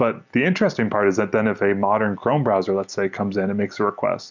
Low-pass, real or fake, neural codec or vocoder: 7.2 kHz; real; none